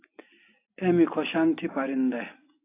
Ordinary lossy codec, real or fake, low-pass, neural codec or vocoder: AAC, 24 kbps; real; 3.6 kHz; none